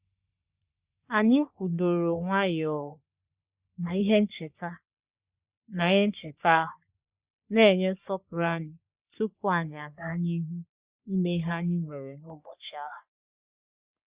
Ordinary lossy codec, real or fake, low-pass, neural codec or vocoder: Opus, 64 kbps; fake; 3.6 kHz; codec, 44.1 kHz, 3.4 kbps, Pupu-Codec